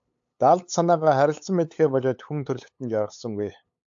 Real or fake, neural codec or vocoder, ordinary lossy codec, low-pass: fake; codec, 16 kHz, 8 kbps, FunCodec, trained on LibriTTS, 25 frames a second; AAC, 64 kbps; 7.2 kHz